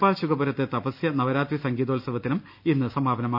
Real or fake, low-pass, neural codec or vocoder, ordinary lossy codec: real; 5.4 kHz; none; AAC, 48 kbps